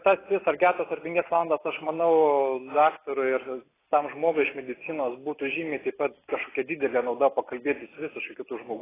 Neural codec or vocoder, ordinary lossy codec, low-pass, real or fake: none; AAC, 16 kbps; 3.6 kHz; real